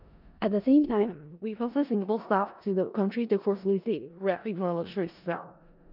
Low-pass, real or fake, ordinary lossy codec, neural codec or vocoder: 5.4 kHz; fake; none; codec, 16 kHz in and 24 kHz out, 0.4 kbps, LongCat-Audio-Codec, four codebook decoder